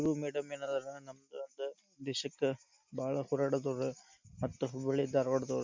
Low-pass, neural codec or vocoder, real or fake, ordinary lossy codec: 7.2 kHz; none; real; MP3, 64 kbps